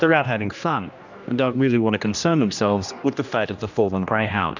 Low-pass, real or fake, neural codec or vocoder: 7.2 kHz; fake; codec, 16 kHz, 1 kbps, X-Codec, HuBERT features, trained on general audio